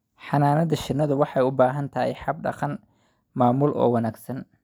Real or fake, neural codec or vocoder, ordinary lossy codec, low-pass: real; none; none; none